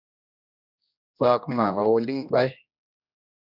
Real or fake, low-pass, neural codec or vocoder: fake; 5.4 kHz; codec, 16 kHz, 1 kbps, X-Codec, HuBERT features, trained on general audio